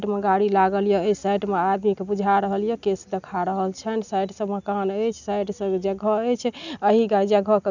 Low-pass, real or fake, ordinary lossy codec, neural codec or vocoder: 7.2 kHz; real; none; none